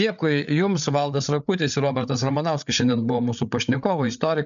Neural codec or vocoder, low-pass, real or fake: codec, 16 kHz, 8 kbps, FreqCodec, larger model; 7.2 kHz; fake